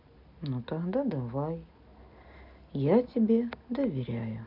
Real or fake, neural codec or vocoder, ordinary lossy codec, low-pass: real; none; none; 5.4 kHz